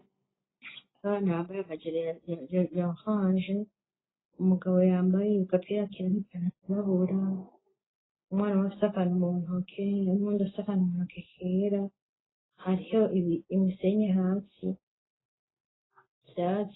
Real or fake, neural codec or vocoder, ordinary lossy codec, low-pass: real; none; AAC, 16 kbps; 7.2 kHz